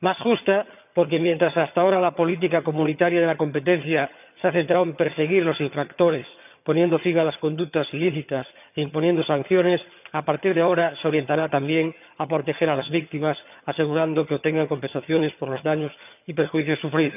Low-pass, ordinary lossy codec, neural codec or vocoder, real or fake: 3.6 kHz; none; vocoder, 22.05 kHz, 80 mel bands, HiFi-GAN; fake